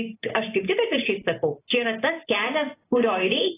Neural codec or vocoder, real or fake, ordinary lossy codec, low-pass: none; real; AAC, 16 kbps; 3.6 kHz